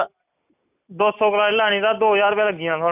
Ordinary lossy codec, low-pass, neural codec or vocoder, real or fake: none; 3.6 kHz; none; real